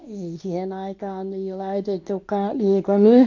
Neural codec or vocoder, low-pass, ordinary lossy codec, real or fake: codec, 24 kHz, 0.9 kbps, WavTokenizer, medium speech release version 2; 7.2 kHz; AAC, 48 kbps; fake